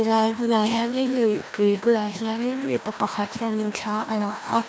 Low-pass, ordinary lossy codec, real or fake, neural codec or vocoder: none; none; fake; codec, 16 kHz, 1 kbps, FreqCodec, larger model